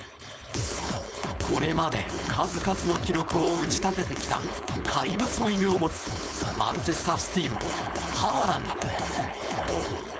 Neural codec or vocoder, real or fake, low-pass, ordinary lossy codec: codec, 16 kHz, 4.8 kbps, FACodec; fake; none; none